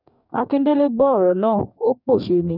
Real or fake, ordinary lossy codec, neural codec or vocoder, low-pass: fake; none; codec, 44.1 kHz, 2.6 kbps, DAC; 5.4 kHz